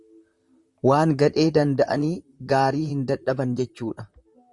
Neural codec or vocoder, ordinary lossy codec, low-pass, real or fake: vocoder, 44.1 kHz, 128 mel bands, Pupu-Vocoder; Opus, 64 kbps; 10.8 kHz; fake